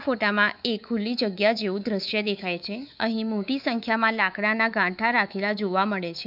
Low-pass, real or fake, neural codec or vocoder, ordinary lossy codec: 5.4 kHz; fake; codec, 24 kHz, 3.1 kbps, DualCodec; none